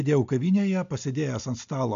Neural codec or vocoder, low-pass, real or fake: none; 7.2 kHz; real